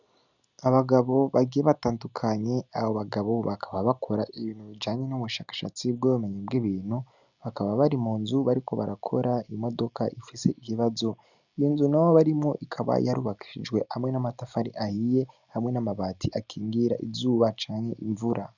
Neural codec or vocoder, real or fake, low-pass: none; real; 7.2 kHz